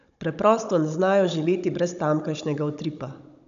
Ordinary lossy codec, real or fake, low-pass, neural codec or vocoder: none; fake; 7.2 kHz; codec, 16 kHz, 16 kbps, FunCodec, trained on Chinese and English, 50 frames a second